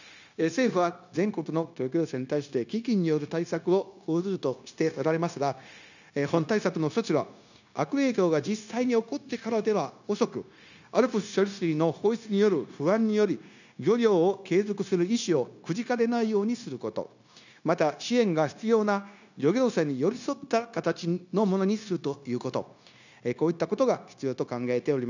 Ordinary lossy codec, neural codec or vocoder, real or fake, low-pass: none; codec, 16 kHz, 0.9 kbps, LongCat-Audio-Codec; fake; 7.2 kHz